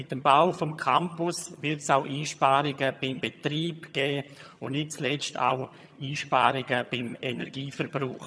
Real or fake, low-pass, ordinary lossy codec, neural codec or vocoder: fake; none; none; vocoder, 22.05 kHz, 80 mel bands, HiFi-GAN